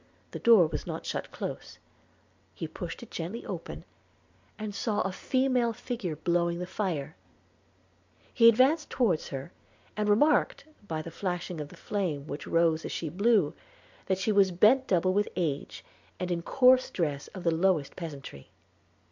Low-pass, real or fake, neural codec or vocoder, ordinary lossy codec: 7.2 kHz; real; none; MP3, 64 kbps